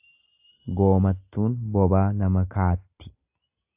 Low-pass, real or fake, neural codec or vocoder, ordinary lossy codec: 3.6 kHz; real; none; AAC, 32 kbps